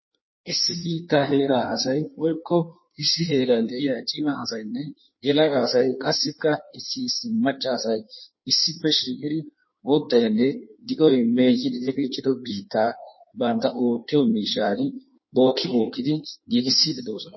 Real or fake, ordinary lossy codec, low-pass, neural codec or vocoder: fake; MP3, 24 kbps; 7.2 kHz; codec, 16 kHz in and 24 kHz out, 1.1 kbps, FireRedTTS-2 codec